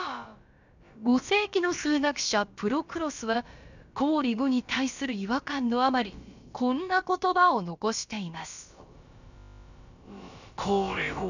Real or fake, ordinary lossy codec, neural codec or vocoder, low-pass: fake; none; codec, 16 kHz, about 1 kbps, DyCAST, with the encoder's durations; 7.2 kHz